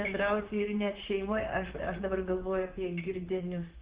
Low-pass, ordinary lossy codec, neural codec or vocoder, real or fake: 3.6 kHz; Opus, 16 kbps; vocoder, 44.1 kHz, 128 mel bands, Pupu-Vocoder; fake